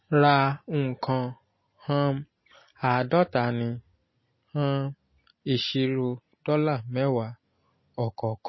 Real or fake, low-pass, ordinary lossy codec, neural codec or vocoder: real; 7.2 kHz; MP3, 24 kbps; none